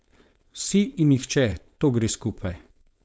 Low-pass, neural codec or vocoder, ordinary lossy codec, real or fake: none; codec, 16 kHz, 4.8 kbps, FACodec; none; fake